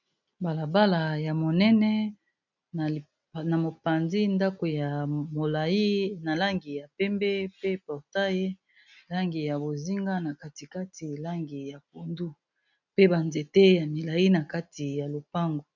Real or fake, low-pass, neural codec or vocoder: real; 7.2 kHz; none